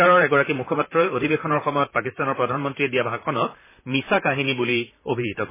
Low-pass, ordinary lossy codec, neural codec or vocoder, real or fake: 3.6 kHz; MP3, 16 kbps; vocoder, 44.1 kHz, 128 mel bands, Pupu-Vocoder; fake